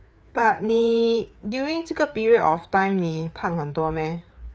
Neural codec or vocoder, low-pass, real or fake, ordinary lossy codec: codec, 16 kHz, 4 kbps, FreqCodec, larger model; none; fake; none